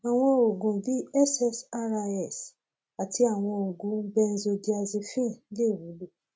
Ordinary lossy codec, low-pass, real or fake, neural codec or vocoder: none; none; real; none